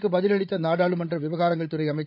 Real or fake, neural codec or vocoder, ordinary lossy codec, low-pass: fake; codec, 16 kHz, 16 kbps, FreqCodec, larger model; none; 5.4 kHz